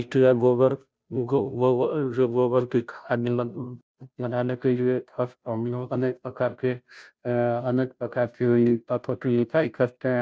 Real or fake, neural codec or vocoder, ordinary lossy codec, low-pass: fake; codec, 16 kHz, 0.5 kbps, FunCodec, trained on Chinese and English, 25 frames a second; none; none